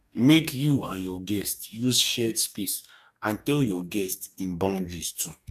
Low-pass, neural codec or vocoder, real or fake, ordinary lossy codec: 14.4 kHz; codec, 44.1 kHz, 2.6 kbps, DAC; fake; none